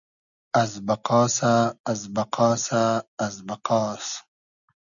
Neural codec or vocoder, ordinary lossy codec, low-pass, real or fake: none; MP3, 96 kbps; 7.2 kHz; real